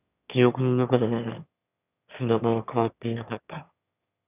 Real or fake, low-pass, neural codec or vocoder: fake; 3.6 kHz; autoencoder, 22.05 kHz, a latent of 192 numbers a frame, VITS, trained on one speaker